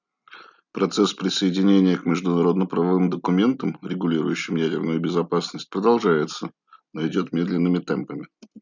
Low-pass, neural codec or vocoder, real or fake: 7.2 kHz; none; real